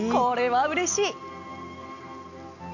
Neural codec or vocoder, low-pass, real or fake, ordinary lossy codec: none; 7.2 kHz; real; none